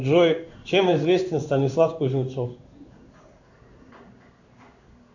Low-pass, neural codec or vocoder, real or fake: 7.2 kHz; codec, 16 kHz in and 24 kHz out, 1 kbps, XY-Tokenizer; fake